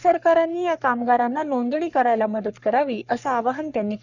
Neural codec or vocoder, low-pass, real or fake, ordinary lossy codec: codec, 44.1 kHz, 3.4 kbps, Pupu-Codec; 7.2 kHz; fake; none